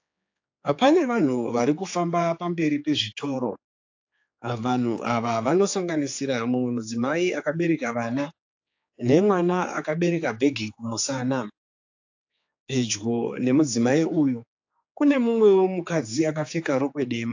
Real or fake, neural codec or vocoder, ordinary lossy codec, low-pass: fake; codec, 16 kHz, 4 kbps, X-Codec, HuBERT features, trained on general audio; AAC, 48 kbps; 7.2 kHz